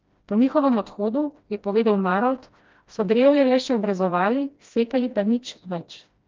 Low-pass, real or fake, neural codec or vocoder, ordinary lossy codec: 7.2 kHz; fake; codec, 16 kHz, 1 kbps, FreqCodec, smaller model; Opus, 24 kbps